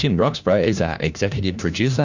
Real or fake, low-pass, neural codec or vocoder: fake; 7.2 kHz; codec, 16 kHz, 1 kbps, FunCodec, trained on LibriTTS, 50 frames a second